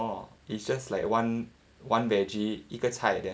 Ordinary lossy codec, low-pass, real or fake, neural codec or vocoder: none; none; real; none